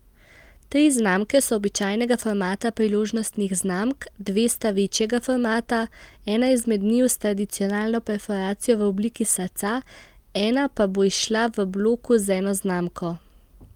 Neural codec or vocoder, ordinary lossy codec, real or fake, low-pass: none; Opus, 32 kbps; real; 19.8 kHz